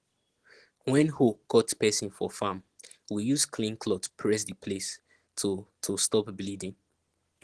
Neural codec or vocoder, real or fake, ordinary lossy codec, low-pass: none; real; Opus, 16 kbps; 10.8 kHz